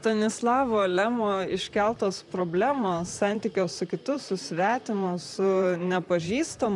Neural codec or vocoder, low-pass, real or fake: vocoder, 44.1 kHz, 128 mel bands, Pupu-Vocoder; 10.8 kHz; fake